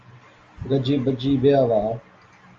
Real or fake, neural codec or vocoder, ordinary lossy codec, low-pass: real; none; Opus, 32 kbps; 7.2 kHz